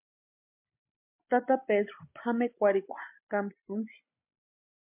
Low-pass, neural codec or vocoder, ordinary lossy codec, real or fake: 3.6 kHz; none; MP3, 32 kbps; real